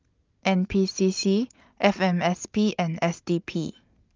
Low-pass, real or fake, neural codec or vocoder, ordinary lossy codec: 7.2 kHz; real; none; Opus, 32 kbps